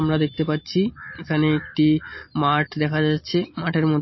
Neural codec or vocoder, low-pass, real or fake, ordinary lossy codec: none; 7.2 kHz; real; MP3, 24 kbps